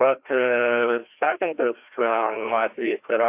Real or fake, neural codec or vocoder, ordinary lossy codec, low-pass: fake; codec, 16 kHz, 1 kbps, FreqCodec, larger model; AAC, 24 kbps; 3.6 kHz